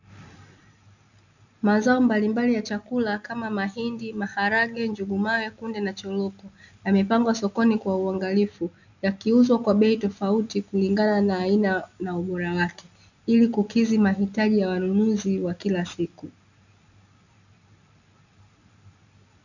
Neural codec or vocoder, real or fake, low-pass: none; real; 7.2 kHz